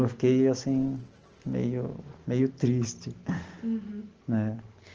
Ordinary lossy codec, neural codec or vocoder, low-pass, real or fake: Opus, 16 kbps; none; 7.2 kHz; real